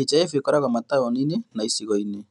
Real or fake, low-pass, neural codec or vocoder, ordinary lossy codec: real; 10.8 kHz; none; none